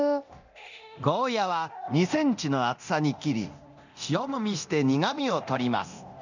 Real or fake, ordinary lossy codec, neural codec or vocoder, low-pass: fake; none; codec, 24 kHz, 0.9 kbps, DualCodec; 7.2 kHz